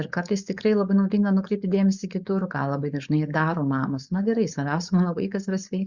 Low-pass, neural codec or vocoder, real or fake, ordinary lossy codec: 7.2 kHz; codec, 16 kHz, 4.8 kbps, FACodec; fake; Opus, 64 kbps